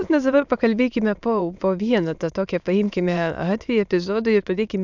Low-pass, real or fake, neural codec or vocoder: 7.2 kHz; fake; autoencoder, 22.05 kHz, a latent of 192 numbers a frame, VITS, trained on many speakers